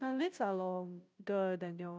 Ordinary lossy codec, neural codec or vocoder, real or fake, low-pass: none; codec, 16 kHz, 0.5 kbps, FunCodec, trained on Chinese and English, 25 frames a second; fake; none